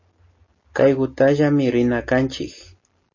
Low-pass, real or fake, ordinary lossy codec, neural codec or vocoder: 7.2 kHz; real; MP3, 32 kbps; none